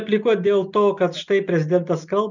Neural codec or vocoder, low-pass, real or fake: none; 7.2 kHz; real